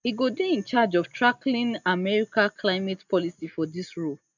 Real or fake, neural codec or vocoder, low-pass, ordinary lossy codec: real; none; 7.2 kHz; none